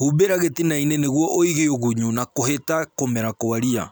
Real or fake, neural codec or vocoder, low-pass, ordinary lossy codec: real; none; none; none